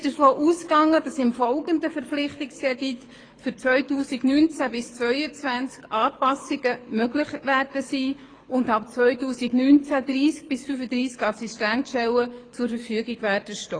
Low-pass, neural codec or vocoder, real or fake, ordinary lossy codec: 9.9 kHz; codec, 24 kHz, 6 kbps, HILCodec; fake; AAC, 32 kbps